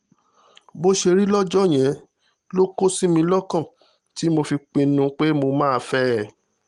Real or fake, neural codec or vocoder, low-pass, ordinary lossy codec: real; none; 10.8 kHz; Opus, 24 kbps